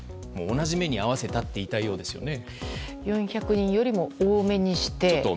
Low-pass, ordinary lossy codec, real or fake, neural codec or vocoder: none; none; real; none